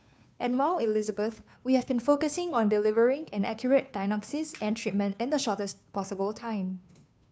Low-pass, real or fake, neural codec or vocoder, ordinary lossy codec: none; fake; codec, 16 kHz, 2 kbps, FunCodec, trained on Chinese and English, 25 frames a second; none